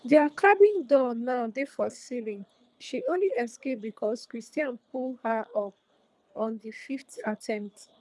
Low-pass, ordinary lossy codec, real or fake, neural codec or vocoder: none; none; fake; codec, 24 kHz, 3 kbps, HILCodec